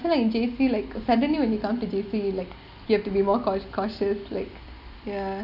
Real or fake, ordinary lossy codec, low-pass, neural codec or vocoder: real; none; 5.4 kHz; none